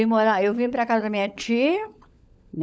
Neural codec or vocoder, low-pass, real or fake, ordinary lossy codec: codec, 16 kHz, 16 kbps, FunCodec, trained on LibriTTS, 50 frames a second; none; fake; none